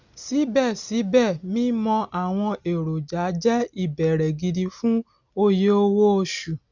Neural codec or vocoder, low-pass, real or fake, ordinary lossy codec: none; 7.2 kHz; real; none